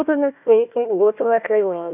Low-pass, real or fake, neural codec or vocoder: 3.6 kHz; fake; codec, 16 kHz, 1 kbps, FunCodec, trained on Chinese and English, 50 frames a second